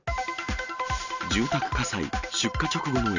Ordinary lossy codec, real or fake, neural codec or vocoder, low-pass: none; real; none; 7.2 kHz